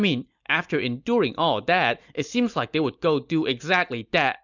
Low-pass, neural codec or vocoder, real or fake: 7.2 kHz; none; real